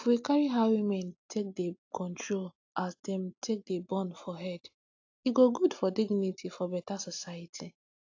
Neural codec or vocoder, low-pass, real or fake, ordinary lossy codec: none; 7.2 kHz; real; AAC, 48 kbps